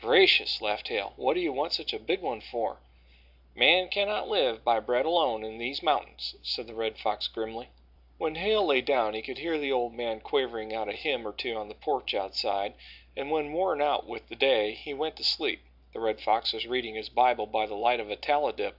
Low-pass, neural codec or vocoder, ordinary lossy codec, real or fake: 5.4 kHz; none; AAC, 48 kbps; real